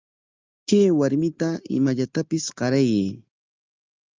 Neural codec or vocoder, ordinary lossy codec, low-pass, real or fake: none; Opus, 24 kbps; 7.2 kHz; real